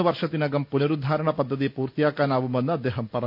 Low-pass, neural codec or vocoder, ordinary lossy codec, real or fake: 5.4 kHz; none; none; real